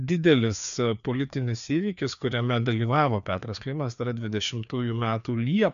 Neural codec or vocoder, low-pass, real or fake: codec, 16 kHz, 2 kbps, FreqCodec, larger model; 7.2 kHz; fake